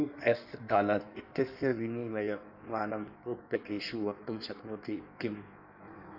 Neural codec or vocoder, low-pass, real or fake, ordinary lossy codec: codec, 16 kHz in and 24 kHz out, 1.1 kbps, FireRedTTS-2 codec; 5.4 kHz; fake; none